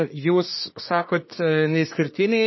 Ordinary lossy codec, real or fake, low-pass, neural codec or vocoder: MP3, 24 kbps; fake; 7.2 kHz; codec, 24 kHz, 1 kbps, SNAC